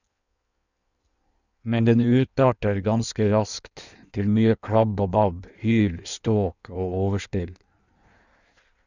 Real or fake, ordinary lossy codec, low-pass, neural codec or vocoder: fake; none; 7.2 kHz; codec, 16 kHz in and 24 kHz out, 1.1 kbps, FireRedTTS-2 codec